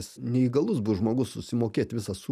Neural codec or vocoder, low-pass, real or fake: none; 14.4 kHz; real